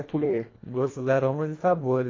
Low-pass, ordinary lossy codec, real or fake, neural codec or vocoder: 7.2 kHz; AAC, 32 kbps; fake; codec, 24 kHz, 1.5 kbps, HILCodec